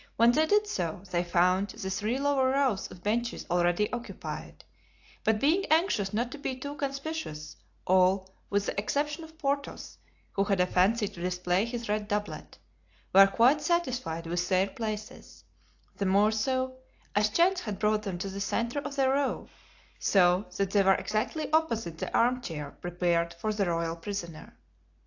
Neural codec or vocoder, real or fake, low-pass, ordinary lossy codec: none; real; 7.2 kHz; AAC, 48 kbps